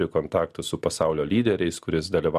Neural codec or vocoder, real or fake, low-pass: none; real; 14.4 kHz